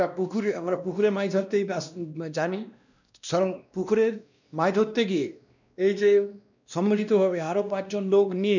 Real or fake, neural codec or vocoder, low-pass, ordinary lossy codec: fake; codec, 16 kHz, 1 kbps, X-Codec, WavLM features, trained on Multilingual LibriSpeech; 7.2 kHz; none